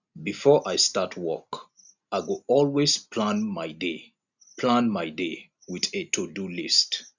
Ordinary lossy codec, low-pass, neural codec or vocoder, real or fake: none; 7.2 kHz; none; real